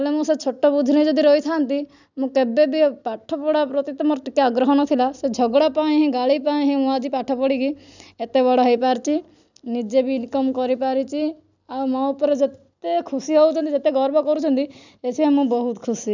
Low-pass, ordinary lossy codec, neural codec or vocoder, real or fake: 7.2 kHz; none; none; real